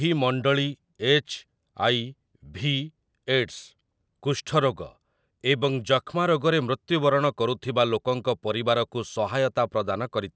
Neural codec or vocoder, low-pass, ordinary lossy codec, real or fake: none; none; none; real